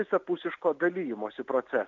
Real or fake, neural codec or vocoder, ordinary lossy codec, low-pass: real; none; AAC, 48 kbps; 7.2 kHz